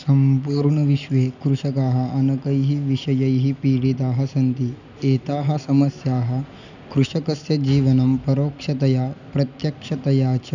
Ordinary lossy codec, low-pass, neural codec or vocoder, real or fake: none; 7.2 kHz; none; real